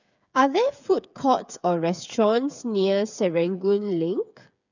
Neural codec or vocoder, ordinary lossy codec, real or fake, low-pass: codec, 16 kHz, 8 kbps, FreqCodec, smaller model; none; fake; 7.2 kHz